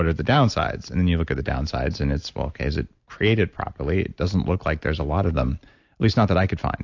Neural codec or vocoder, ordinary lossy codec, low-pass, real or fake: none; AAC, 48 kbps; 7.2 kHz; real